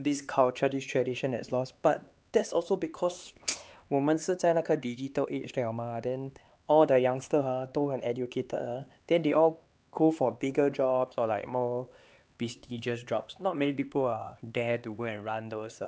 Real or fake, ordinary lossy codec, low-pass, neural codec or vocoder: fake; none; none; codec, 16 kHz, 2 kbps, X-Codec, HuBERT features, trained on LibriSpeech